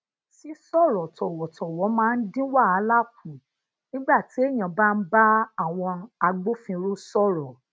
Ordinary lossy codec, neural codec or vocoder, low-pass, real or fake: none; none; none; real